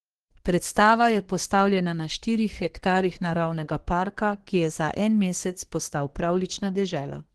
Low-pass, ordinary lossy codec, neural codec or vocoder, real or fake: 10.8 kHz; Opus, 16 kbps; codec, 24 kHz, 1 kbps, SNAC; fake